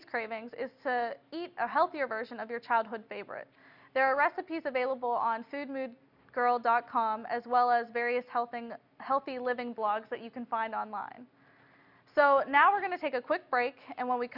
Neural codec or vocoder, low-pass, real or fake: none; 5.4 kHz; real